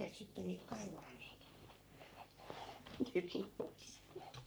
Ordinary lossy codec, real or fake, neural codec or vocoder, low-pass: none; fake; codec, 44.1 kHz, 3.4 kbps, Pupu-Codec; none